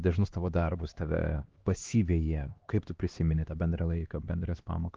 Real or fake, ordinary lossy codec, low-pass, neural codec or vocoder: fake; Opus, 16 kbps; 7.2 kHz; codec, 16 kHz, 2 kbps, X-Codec, HuBERT features, trained on LibriSpeech